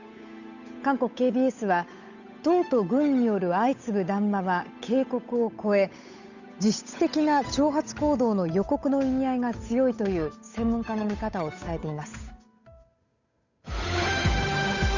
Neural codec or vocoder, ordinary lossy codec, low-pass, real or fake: codec, 16 kHz, 8 kbps, FunCodec, trained on Chinese and English, 25 frames a second; none; 7.2 kHz; fake